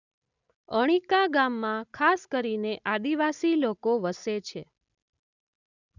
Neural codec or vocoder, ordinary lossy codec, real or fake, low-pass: none; none; real; 7.2 kHz